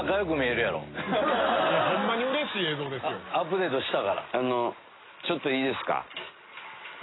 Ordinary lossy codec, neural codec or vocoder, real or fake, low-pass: AAC, 16 kbps; none; real; 7.2 kHz